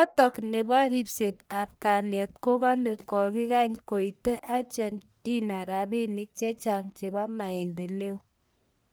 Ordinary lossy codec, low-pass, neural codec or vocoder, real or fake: none; none; codec, 44.1 kHz, 1.7 kbps, Pupu-Codec; fake